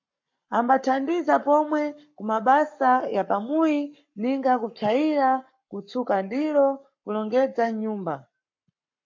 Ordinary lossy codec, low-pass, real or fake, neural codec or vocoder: MP3, 48 kbps; 7.2 kHz; fake; codec, 44.1 kHz, 7.8 kbps, Pupu-Codec